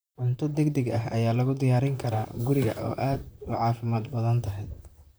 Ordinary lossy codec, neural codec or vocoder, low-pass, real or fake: none; vocoder, 44.1 kHz, 128 mel bands, Pupu-Vocoder; none; fake